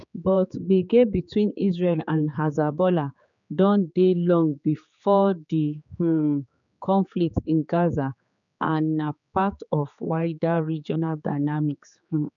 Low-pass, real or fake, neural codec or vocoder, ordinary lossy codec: 7.2 kHz; fake; codec, 16 kHz, 4 kbps, X-Codec, HuBERT features, trained on general audio; none